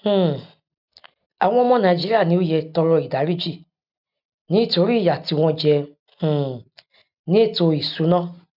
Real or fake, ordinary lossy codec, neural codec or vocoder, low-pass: real; none; none; 5.4 kHz